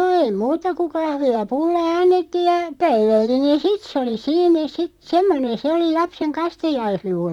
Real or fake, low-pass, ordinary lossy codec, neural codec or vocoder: fake; 19.8 kHz; none; vocoder, 44.1 kHz, 128 mel bands, Pupu-Vocoder